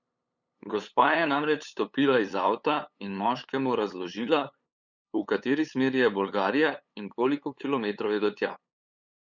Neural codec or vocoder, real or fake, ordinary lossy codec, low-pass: codec, 16 kHz, 8 kbps, FunCodec, trained on LibriTTS, 25 frames a second; fake; none; 7.2 kHz